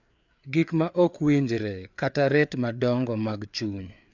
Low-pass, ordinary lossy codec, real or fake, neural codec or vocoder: 7.2 kHz; none; fake; codec, 16 kHz, 4 kbps, FreqCodec, larger model